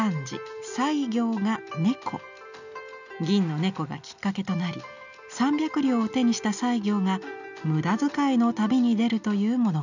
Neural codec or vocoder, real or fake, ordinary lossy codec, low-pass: none; real; none; 7.2 kHz